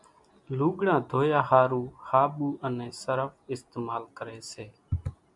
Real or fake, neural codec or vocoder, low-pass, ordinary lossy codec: real; none; 10.8 kHz; MP3, 96 kbps